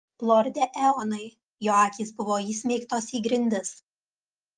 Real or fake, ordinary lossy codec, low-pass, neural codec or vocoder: real; Opus, 32 kbps; 9.9 kHz; none